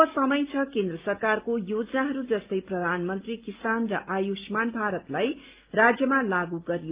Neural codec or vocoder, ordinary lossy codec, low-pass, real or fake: none; Opus, 32 kbps; 3.6 kHz; real